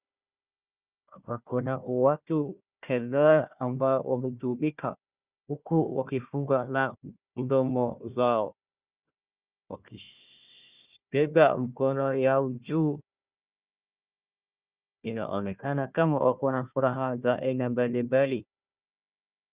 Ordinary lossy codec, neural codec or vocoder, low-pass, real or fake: Opus, 64 kbps; codec, 16 kHz, 1 kbps, FunCodec, trained on Chinese and English, 50 frames a second; 3.6 kHz; fake